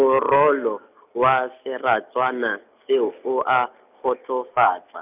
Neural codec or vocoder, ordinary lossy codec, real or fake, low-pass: none; none; real; 3.6 kHz